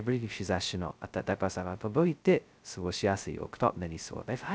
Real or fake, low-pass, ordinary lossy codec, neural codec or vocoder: fake; none; none; codec, 16 kHz, 0.2 kbps, FocalCodec